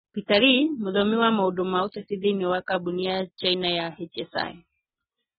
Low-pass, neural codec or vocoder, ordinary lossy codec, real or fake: 19.8 kHz; none; AAC, 16 kbps; real